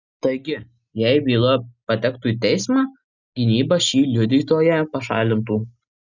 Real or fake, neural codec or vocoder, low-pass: real; none; 7.2 kHz